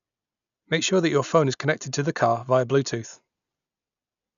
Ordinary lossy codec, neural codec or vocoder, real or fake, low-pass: none; none; real; 7.2 kHz